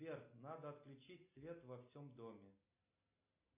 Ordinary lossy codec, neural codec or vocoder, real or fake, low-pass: MP3, 24 kbps; none; real; 3.6 kHz